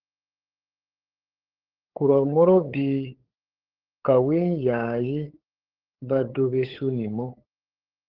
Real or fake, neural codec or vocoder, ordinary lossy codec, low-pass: fake; codec, 16 kHz, 8 kbps, FunCodec, trained on LibriTTS, 25 frames a second; Opus, 16 kbps; 5.4 kHz